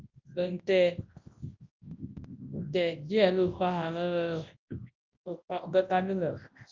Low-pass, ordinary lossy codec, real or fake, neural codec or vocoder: 7.2 kHz; Opus, 24 kbps; fake; codec, 24 kHz, 0.9 kbps, WavTokenizer, large speech release